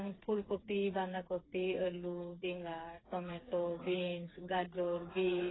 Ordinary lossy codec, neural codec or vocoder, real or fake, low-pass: AAC, 16 kbps; codec, 16 kHz, 4 kbps, FreqCodec, smaller model; fake; 7.2 kHz